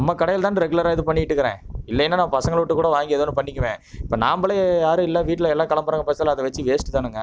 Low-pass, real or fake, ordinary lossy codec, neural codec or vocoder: none; real; none; none